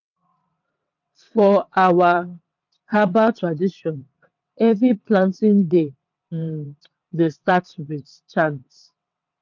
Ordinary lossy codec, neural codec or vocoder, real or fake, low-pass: none; vocoder, 22.05 kHz, 80 mel bands, WaveNeXt; fake; 7.2 kHz